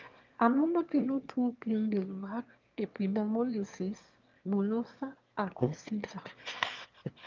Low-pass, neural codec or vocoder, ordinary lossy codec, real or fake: 7.2 kHz; autoencoder, 22.05 kHz, a latent of 192 numbers a frame, VITS, trained on one speaker; Opus, 24 kbps; fake